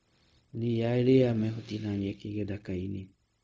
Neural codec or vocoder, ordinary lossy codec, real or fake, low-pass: codec, 16 kHz, 0.4 kbps, LongCat-Audio-Codec; none; fake; none